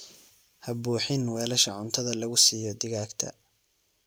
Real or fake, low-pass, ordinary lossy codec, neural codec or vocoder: real; none; none; none